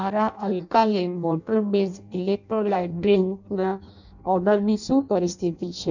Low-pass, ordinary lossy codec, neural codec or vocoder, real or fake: 7.2 kHz; none; codec, 16 kHz in and 24 kHz out, 0.6 kbps, FireRedTTS-2 codec; fake